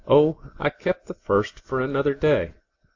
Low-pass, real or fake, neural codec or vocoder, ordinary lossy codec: 7.2 kHz; real; none; AAC, 48 kbps